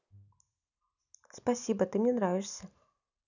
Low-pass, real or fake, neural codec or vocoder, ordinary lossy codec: 7.2 kHz; real; none; none